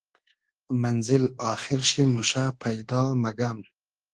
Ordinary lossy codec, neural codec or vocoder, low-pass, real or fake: Opus, 16 kbps; codec, 24 kHz, 1.2 kbps, DualCodec; 10.8 kHz; fake